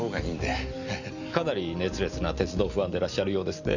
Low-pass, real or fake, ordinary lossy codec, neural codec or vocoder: 7.2 kHz; real; none; none